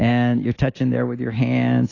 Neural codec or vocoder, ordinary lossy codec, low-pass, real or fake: none; AAC, 32 kbps; 7.2 kHz; real